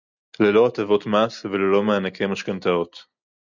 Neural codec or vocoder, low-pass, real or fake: none; 7.2 kHz; real